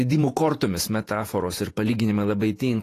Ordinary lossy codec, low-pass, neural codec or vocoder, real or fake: AAC, 48 kbps; 14.4 kHz; vocoder, 48 kHz, 128 mel bands, Vocos; fake